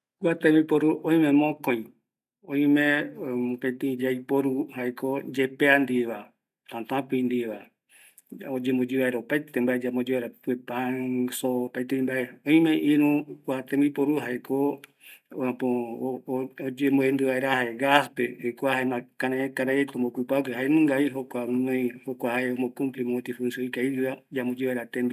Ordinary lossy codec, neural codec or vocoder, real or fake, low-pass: none; none; real; 14.4 kHz